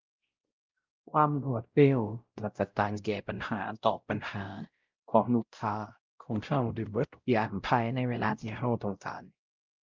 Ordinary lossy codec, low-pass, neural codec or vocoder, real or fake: Opus, 24 kbps; 7.2 kHz; codec, 16 kHz, 0.5 kbps, X-Codec, WavLM features, trained on Multilingual LibriSpeech; fake